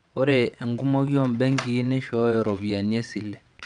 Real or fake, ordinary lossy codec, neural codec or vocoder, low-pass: fake; none; vocoder, 22.05 kHz, 80 mel bands, WaveNeXt; 9.9 kHz